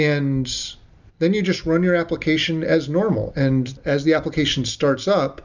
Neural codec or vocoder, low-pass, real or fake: none; 7.2 kHz; real